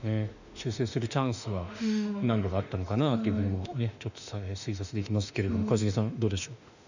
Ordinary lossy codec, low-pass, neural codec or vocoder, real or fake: none; 7.2 kHz; autoencoder, 48 kHz, 32 numbers a frame, DAC-VAE, trained on Japanese speech; fake